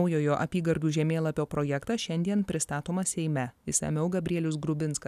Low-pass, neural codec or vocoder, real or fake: 14.4 kHz; none; real